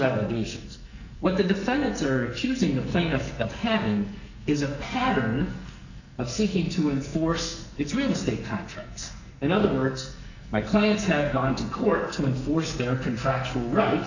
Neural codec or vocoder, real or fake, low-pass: codec, 44.1 kHz, 2.6 kbps, SNAC; fake; 7.2 kHz